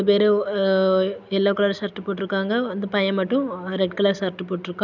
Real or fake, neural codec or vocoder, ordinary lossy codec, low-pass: real; none; none; 7.2 kHz